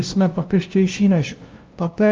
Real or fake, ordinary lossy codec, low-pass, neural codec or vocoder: fake; Opus, 24 kbps; 7.2 kHz; codec, 16 kHz, 0.5 kbps, FunCodec, trained on LibriTTS, 25 frames a second